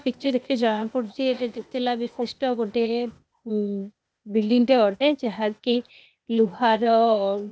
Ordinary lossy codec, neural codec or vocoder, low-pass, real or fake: none; codec, 16 kHz, 0.8 kbps, ZipCodec; none; fake